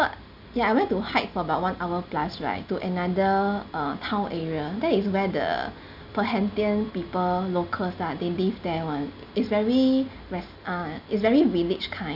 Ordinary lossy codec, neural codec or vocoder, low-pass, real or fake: none; none; 5.4 kHz; real